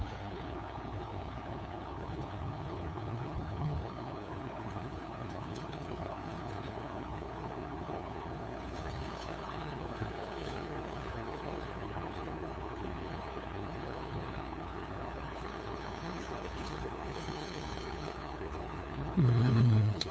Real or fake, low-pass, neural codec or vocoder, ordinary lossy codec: fake; none; codec, 16 kHz, 2 kbps, FunCodec, trained on LibriTTS, 25 frames a second; none